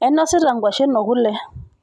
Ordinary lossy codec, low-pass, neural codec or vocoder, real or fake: none; none; none; real